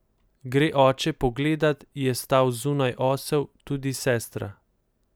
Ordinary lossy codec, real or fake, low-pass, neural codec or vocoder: none; real; none; none